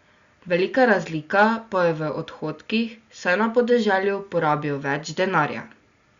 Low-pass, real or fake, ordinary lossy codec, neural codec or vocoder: 7.2 kHz; real; Opus, 64 kbps; none